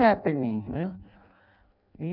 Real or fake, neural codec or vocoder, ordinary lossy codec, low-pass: fake; codec, 16 kHz in and 24 kHz out, 0.6 kbps, FireRedTTS-2 codec; none; 5.4 kHz